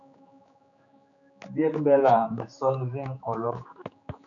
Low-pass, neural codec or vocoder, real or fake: 7.2 kHz; codec, 16 kHz, 4 kbps, X-Codec, HuBERT features, trained on general audio; fake